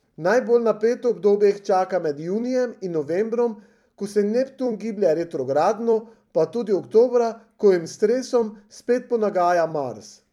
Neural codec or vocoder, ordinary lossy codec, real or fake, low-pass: vocoder, 44.1 kHz, 128 mel bands every 256 samples, BigVGAN v2; MP3, 96 kbps; fake; 19.8 kHz